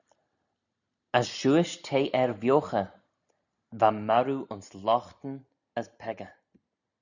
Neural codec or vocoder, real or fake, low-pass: none; real; 7.2 kHz